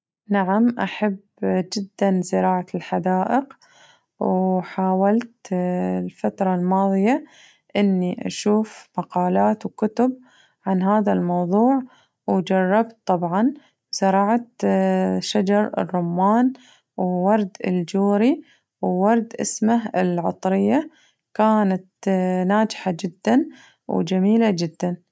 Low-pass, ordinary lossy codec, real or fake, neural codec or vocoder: none; none; real; none